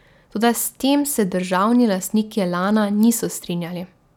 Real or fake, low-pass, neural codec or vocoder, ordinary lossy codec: real; 19.8 kHz; none; none